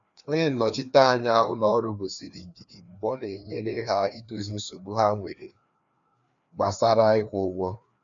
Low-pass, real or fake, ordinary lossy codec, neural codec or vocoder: 7.2 kHz; fake; none; codec, 16 kHz, 2 kbps, FreqCodec, larger model